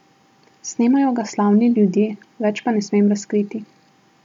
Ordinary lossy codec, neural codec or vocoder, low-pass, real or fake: none; none; 19.8 kHz; real